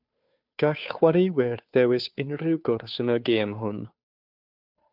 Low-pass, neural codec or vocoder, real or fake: 5.4 kHz; codec, 16 kHz, 2 kbps, FunCodec, trained on Chinese and English, 25 frames a second; fake